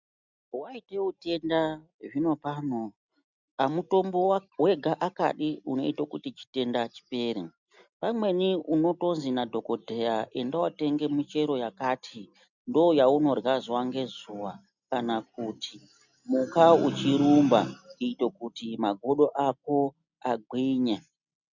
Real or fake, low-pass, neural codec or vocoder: real; 7.2 kHz; none